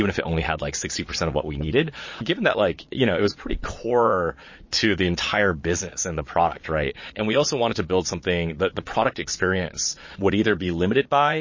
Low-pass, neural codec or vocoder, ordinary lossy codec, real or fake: 7.2 kHz; vocoder, 22.05 kHz, 80 mel bands, Vocos; MP3, 32 kbps; fake